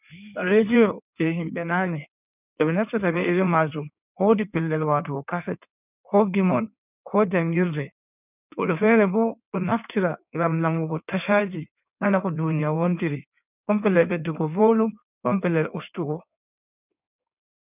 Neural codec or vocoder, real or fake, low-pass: codec, 16 kHz in and 24 kHz out, 1.1 kbps, FireRedTTS-2 codec; fake; 3.6 kHz